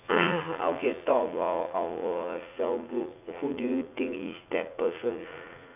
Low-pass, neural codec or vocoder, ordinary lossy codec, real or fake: 3.6 kHz; vocoder, 44.1 kHz, 80 mel bands, Vocos; none; fake